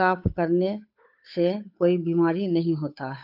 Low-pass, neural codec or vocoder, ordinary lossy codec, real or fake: 5.4 kHz; codec, 16 kHz, 8 kbps, FunCodec, trained on Chinese and English, 25 frames a second; none; fake